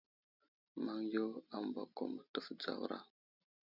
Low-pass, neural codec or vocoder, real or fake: 5.4 kHz; none; real